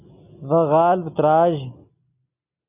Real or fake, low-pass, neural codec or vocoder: real; 3.6 kHz; none